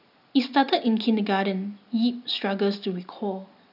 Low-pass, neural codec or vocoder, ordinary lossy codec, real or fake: 5.4 kHz; none; none; real